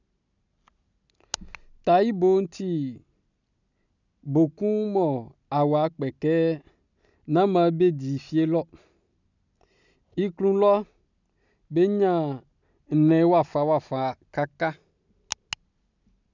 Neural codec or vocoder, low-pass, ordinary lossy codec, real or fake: none; 7.2 kHz; none; real